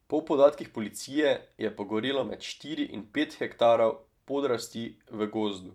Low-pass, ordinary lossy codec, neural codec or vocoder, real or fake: 19.8 kHz; MP3, 96 kbps; vocoder, 44.1 kHz, 128 mel bands every 256 samples, BigVGAN v2; fake